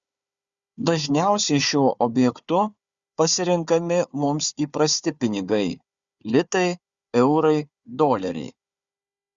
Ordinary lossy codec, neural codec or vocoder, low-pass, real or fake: Opus, 64 kbps; codec, 16 kHz, 4 kbps, FunCodec, trained on Chinese and English, 50 frames a second; 7.2 kHz; fake